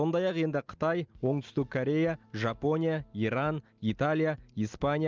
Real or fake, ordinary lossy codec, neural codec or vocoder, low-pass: real; Opus, 32 kbps; none; 7.2 kHz